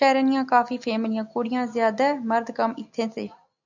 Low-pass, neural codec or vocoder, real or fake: 7.2 kHz; none; real